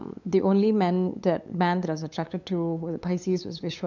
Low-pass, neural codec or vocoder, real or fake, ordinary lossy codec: 7.2 kHz; codec, 16 kHz, 8 kbps, FunCodec, trained on LibriTTS, 25 frames a second; fake; MP3, 64 kbps